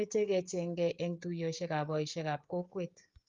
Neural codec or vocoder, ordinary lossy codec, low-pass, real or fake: codec, 16 kHz, 16 kbps, FreqCodec, smaller model; Opus, 32 kbps; 7.2 kHz; fake